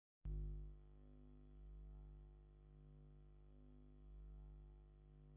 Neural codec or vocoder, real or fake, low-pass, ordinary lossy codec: none; real; 3.6 kHz; AAC, 32 kbps